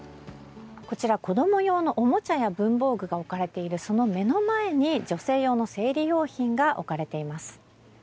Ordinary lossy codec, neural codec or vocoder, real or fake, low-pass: none; none; real; none